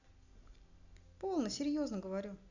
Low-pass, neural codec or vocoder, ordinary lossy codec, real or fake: 7.2 kHz; none; none; real